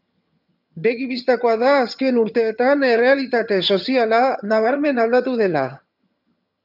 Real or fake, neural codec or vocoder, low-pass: fake; vocoder, 22.05 kHz, 80 mel bands, HiFi-GAN; 5.4 kHz